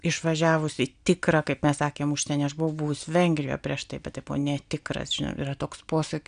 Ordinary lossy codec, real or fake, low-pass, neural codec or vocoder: MP3, 96 kbps; real; 9.9 kHz; none